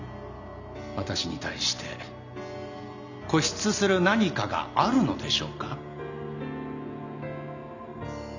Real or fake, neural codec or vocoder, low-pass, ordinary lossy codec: real; none; 7.2 kHz; none